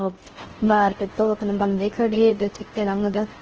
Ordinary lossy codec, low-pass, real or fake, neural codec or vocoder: Opus, 16 kbps; 7.2 kHz; fake; codec, 16 kHz in and 24 kHz out, 0.6 kbps, FocalCodec, streaming, 4096 codes